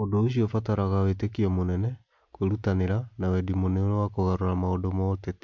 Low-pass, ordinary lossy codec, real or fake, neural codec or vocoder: 7.2 kHz; MP3, 48 kbps; real; none